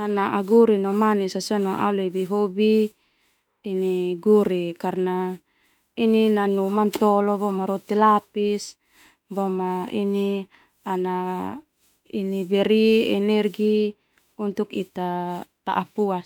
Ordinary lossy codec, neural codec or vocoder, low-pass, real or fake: none; autoencoder, 48 kHz, 32 numbers a frame, DAC-VAE, trained on Japanese speech; 19.8 kHz; fake